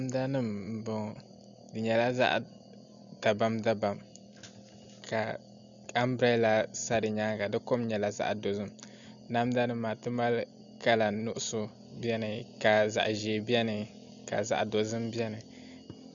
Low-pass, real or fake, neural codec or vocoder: 7.2 kHz; real; none